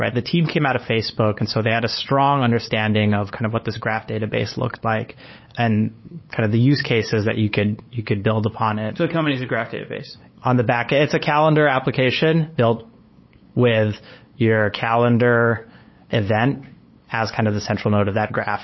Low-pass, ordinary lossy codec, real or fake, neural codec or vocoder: 7.2 kHz; MP3, 24 kbps; fake; codec, 16 kHz, 8 kbps, FunCodec, trained on LibriTTS, 25 frames a second